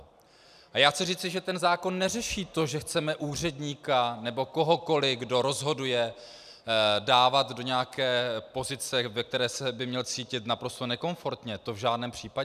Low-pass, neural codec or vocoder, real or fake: 14.4 kHz; none; real